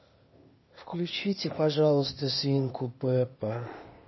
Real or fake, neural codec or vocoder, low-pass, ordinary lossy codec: fake; codec, 16 kHz, 0.8 kbps, ZipCodec; 7.2 kHz; MP3, 24 kbps